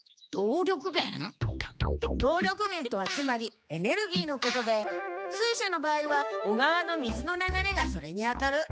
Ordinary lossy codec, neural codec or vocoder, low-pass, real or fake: none; codec, 16 kHz, 2 kbps, X-Codec, HuBERT features, trained on general audio; none; fake